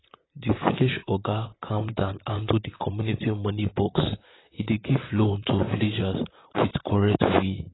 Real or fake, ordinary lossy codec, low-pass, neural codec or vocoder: fake; AAC, 16 kbps; 7.2 kHz; vocoder, 44.1 kHz, 128 mel bands, Pupu-Vocoder